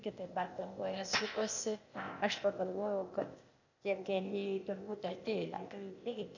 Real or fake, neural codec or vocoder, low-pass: fake; codec, 16 kHz, 0.8 kbps, ZipCodec; 7.2 kHz